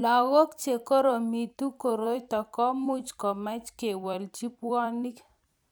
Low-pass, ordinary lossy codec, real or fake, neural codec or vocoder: none; none; fake; vocoder, 44.1 kHz, 128 mel bands every 256 samples, BigVGAN v2